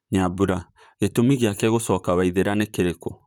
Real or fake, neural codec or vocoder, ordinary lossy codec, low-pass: fake; vocoder, 44.1 kHz, 128 mel bands, Pupu-Vocoder; none; none